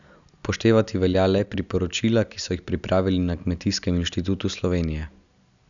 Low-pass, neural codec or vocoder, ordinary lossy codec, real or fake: 7.2 kHz; none; none; real